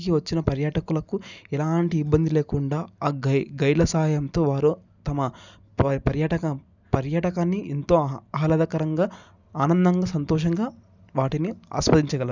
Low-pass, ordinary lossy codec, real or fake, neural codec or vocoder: 7.2 kHz; none; real; none